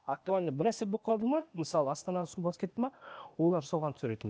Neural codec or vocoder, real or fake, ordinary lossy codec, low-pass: codec, 16 kHz, 0.8 kbps, ZipCodec; fake; none; none